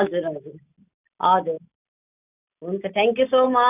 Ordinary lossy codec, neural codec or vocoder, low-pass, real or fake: none; none; 3.6 kHz; real